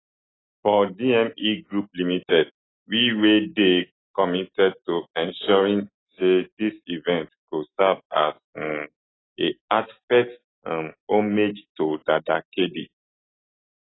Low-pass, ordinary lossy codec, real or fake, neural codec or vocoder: 7.2 kHz; AAC, 16 kbps; real; none